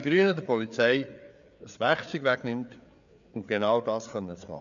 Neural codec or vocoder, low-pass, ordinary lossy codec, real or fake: codec, 16 kHz, 4 kbps, FreqCodec, larger model; 7.2 kHz; none; fake